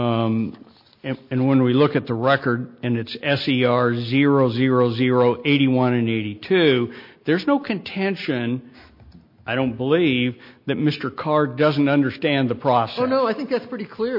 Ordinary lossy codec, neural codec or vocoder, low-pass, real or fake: MP3, 32 kbps; none; 5.4 kHz; real